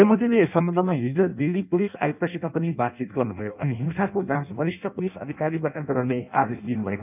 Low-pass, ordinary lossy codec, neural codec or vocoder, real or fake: 3.6 kHz; none; codec, 16 kHz in and 24 kHz out, 0.6 kbps, FireRedTTS-2 codec; fake